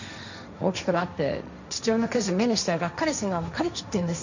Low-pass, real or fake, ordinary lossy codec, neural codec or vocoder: 7.2 kHz; fake; none; codec, 16 kHz, 1.1 kbps, Voila-Tokenizer